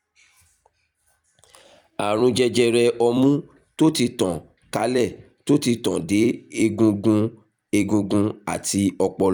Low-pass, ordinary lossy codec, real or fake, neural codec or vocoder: 19.8 kHz; none; fake; vocoder, 44.1 kHz, 128 mel bands every 256 samples, BigVGAN v2